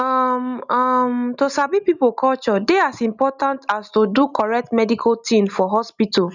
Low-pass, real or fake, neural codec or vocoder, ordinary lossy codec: 7.2 kHz; real; none; none